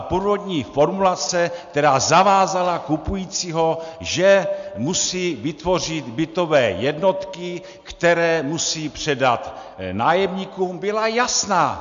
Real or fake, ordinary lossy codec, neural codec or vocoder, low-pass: real; MP3, 64 kbps; none; 7.2 kHz